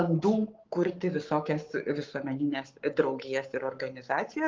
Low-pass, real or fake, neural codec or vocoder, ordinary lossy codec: 7.2 kHz; fake; codec, 44.1 kHz, 7.8 kbps, Pupu-Codec; Opus, 24 kbps